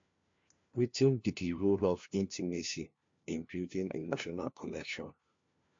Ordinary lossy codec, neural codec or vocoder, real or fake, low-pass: MP3, 48 kbps; codec, 16 kHz, 1 kbps, FunCodec, trained on LibriTTS, 50 frames a second; fake; 7.2 kHz